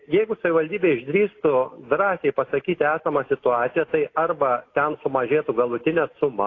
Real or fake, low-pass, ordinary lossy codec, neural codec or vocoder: real; 7.2 kHz; AAC, 32 kbps; none